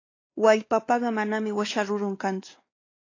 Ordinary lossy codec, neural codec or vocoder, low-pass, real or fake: AAC, 32 kbps; codec, 16 kHz, 2 kbps, X-Codec, WavLM features, trained on Multilingual LibriSpeech; 7.2 kHz; fake